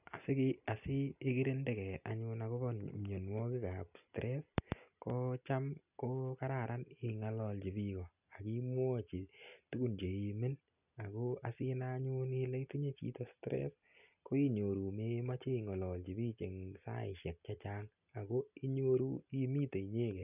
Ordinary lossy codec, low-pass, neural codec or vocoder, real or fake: none; 3.6 kHz; none; real